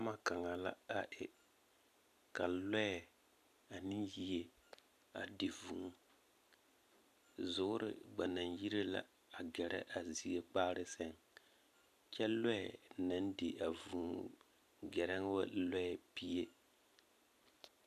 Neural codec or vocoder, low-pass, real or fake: none; 14.4 kHz; real